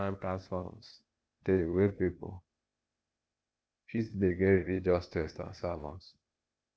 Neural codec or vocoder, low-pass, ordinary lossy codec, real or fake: codec, 16 kHz, 0.8 kbps, ZipCodec; none; none; fake